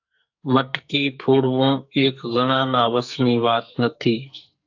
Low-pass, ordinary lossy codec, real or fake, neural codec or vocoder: 7.2 kHz; AAC, 48 kbps; fake; codec, 44.1 kHz, 2.6 kbps, SNAC